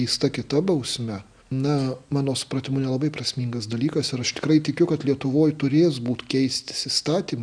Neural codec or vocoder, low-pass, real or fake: none; 9.9 kHz; real